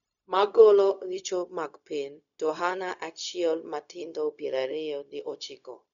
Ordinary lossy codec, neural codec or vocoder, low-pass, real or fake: none; codec, 16 kHz, 0.4 kbps, LongCat-Audio-Codec; 7.2 kHz; fake